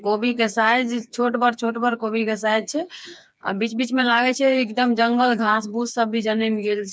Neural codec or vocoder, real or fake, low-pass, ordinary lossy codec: codec, 16 kHz, 4 kbps, FreqCodec, smaller model; fake; none; none